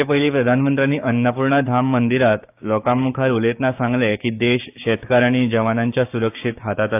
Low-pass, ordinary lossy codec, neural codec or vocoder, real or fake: 3.6 kHz; AAC, 32 kbps; codec, 16 kHz, 6 kbps, DAC; fake